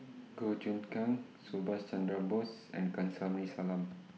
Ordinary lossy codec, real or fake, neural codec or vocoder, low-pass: none; real; none; none